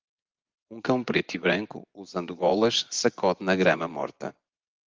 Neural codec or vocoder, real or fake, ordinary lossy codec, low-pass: vocoder, 24 kHz, 100 mel bands, Vocos; fake; Opus, 32 kbps; 7.2 kHz